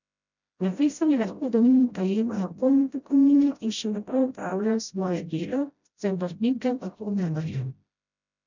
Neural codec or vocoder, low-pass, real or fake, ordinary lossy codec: codec, 16 kHz, 0.5 kbps, FreqCodec, smaller model; 7.2 kHz; fake; none